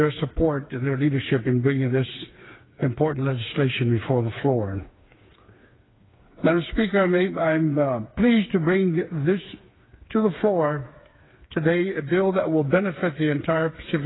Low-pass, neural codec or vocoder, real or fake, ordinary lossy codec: 7.2 kHz; codec, 16 kHz, 4 kbps, FreqCodec, smaller model; fake; AAC, 16 kbps